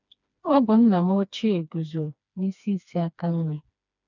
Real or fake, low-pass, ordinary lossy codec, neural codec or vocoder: fake; 7.2 kHz; none; codec, 16 kHz, 2 kbps, FreqCodec, smaller model